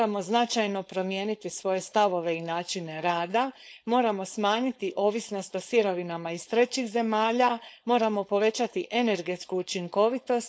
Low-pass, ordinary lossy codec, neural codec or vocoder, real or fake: none; none; codec, 16 kHz, 4.8 kbps, FACodec; fake